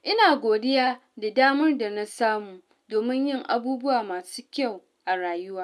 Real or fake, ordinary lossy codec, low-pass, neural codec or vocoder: real; none; none; none